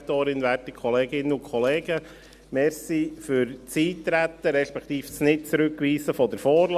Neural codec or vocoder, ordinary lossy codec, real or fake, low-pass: none; Opus, 64 kbps; real; 14.4 kHz